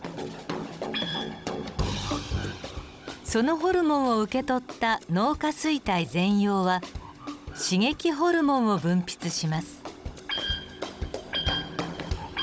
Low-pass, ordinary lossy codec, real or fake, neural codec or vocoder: none; none; fake; codec, 16 kHz, 16 kbps, FunCodec, trained on Chinese and English, 50 frames a second